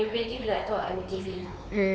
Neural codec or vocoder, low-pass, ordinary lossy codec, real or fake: codec, 16 kHz, 4 kbps, X-Codec, WavLM features, trained on Multilingual LibriSpeech; none; none; fake